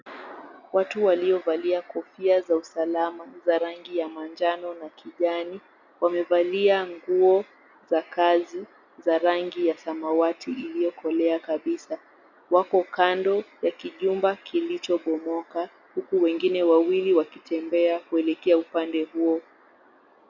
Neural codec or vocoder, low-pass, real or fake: none; 7.2 kHz; real